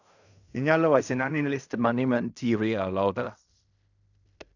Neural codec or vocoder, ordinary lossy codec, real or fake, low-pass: codec, 16 kHz in and 24 kHz out, 0.4 kbps, LongCat-Audio-Codec, fine tuned four codebook decoder; none; fake; 7.2 kHz